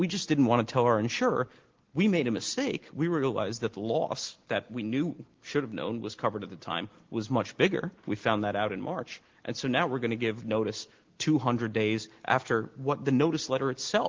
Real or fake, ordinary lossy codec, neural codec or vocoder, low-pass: real; Opus, 16 kbps; none; 7.2 kHz